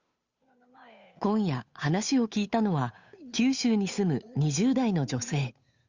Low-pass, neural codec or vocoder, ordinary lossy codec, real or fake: 7.2 kHz; codec, 16 kHz, 8 kbps, FunCodec, trained on Chinese and English, 25 frames a second; Opus, 64 kbps; fake